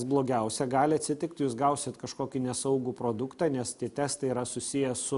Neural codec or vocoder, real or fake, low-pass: none; real; 10.8 kHz